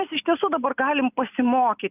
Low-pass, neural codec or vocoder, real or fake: 3.6 kHz; none; real